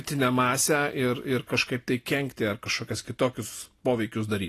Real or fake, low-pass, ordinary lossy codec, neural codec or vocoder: real; 14.4 kHz; AAC, 48 kbps; none